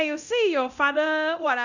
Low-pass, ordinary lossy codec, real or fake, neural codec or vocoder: 7.2 kHz; none; fake; codec, 24 kHz, 0.9 kbps, DualCodec